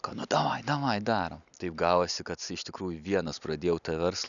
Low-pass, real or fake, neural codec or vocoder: 7.2 kHz; real; none